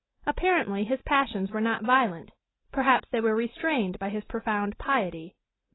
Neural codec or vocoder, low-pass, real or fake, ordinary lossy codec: none; 7.2 kHz; real; AAC, 16 kbps